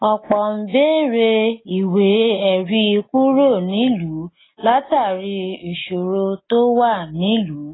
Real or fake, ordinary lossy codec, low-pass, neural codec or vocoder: real; AAC, 16 kbps; 7.2 kHz; none